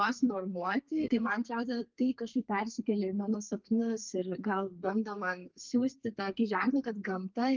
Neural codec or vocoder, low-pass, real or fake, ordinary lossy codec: codec, 32 kHz, 1.9 kbps, SNAC; 7.2 kHz; fake; Opus, 32 kbps